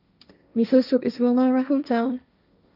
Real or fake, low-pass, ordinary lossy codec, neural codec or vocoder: fake; 5.4 kHz; none; codec, 16 kHz, 1.1 kbps, Voila-Tokenizer